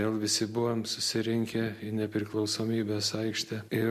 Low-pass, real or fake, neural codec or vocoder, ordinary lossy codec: 14.4 kHz; real; none; MP3, 64 kbps